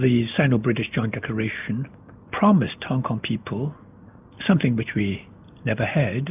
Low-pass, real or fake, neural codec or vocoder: 3.6 kHz; real; none